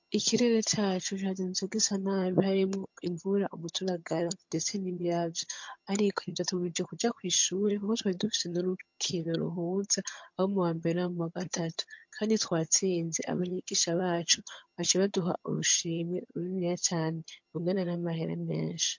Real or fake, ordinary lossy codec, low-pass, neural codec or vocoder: fake; MP3, 48 kbps; 7.2 kHz; vocoder, 22.05 kHz, 80 mel bands, HiFi-GAN